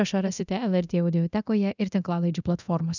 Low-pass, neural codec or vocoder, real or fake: 7.2 kHz; codec, 24 kHz, 0.9 kbps, DualCodec; fake